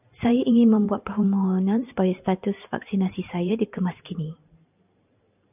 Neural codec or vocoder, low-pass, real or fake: vocoder, 44.1 kHz, 128 mel bands every 512 samples, BigVGAN v2; 3.6 kHz; fake